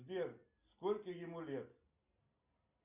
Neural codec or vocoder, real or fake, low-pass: none; real; 3.6 kHz